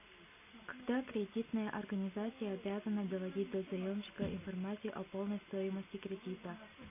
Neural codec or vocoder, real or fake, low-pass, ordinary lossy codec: none; real; 3.6 kHz; MP3, 32 kbps